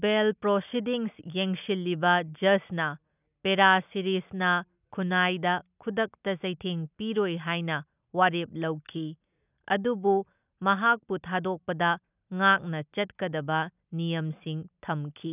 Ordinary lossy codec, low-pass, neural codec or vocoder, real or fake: none; 3.6 kHz; none; real